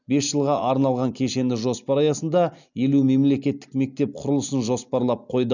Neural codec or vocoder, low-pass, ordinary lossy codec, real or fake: none; 7.2 kHz; none; real